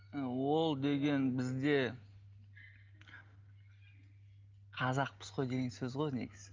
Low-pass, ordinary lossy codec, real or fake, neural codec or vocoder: 7.2 kHz; Opus, 24 kbps; real; none